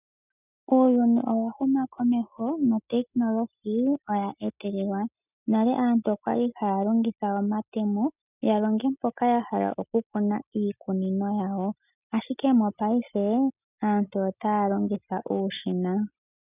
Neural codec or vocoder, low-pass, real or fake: none; 3.6 kHz; real